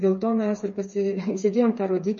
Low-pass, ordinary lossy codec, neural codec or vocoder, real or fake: 7.2 kHz; MP3, 32 kbps; codec, 16 kHz, 4 kbps, FreqCodec, smaller model; fake